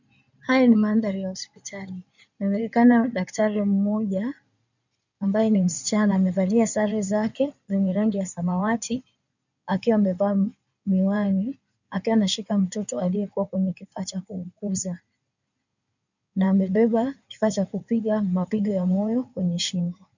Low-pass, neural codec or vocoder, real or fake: 7.2 kHz; codec, 16 kHz in and 24 kHz out, 2.2 kbps, FireRedTTS-2 codec; fake